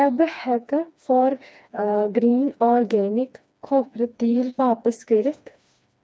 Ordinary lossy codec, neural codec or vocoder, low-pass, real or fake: none; codec, 16 kHz, 2 kbps, FreqCodec, smaller model; none; fake